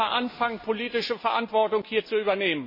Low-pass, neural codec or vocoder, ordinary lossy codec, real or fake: 5.4 kHz; none; MP3, 24 kbps; real